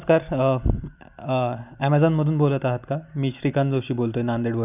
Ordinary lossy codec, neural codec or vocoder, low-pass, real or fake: none; none; 3.6 kHz; real